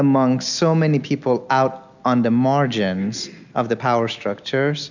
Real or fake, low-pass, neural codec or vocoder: real; 7.2 kHz; none